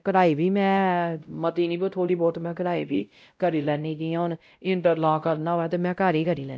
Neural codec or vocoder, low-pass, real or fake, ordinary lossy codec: codec, 16 kHz, 0.5 kbps, X-Codec, WavLM features, trained on Multilingual LibriSpeech; none; fake; none